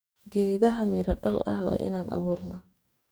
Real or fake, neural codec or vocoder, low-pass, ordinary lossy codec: fake; codec, 44.1 kHz, 2.6 kbps, DAC; none; none